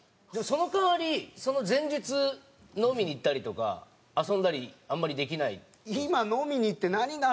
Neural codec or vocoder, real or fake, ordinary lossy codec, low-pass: none; real; none; none